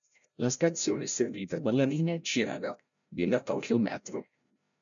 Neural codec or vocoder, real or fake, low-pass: codec, 16 kHz, 0.5 kbps, FreqCodec, larger model; fake; 7.2 kHz